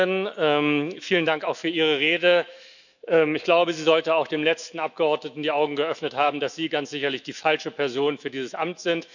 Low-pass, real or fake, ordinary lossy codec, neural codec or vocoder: 7.2 kHz; fake; none; autoencoder, 48 kHz, 128 numbers a frame, DAC-VAE, trained on Japanese speech